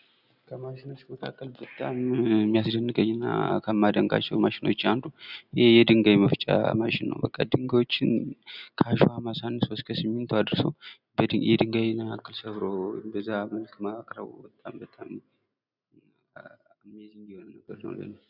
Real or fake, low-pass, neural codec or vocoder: real; 5.4 kHz; none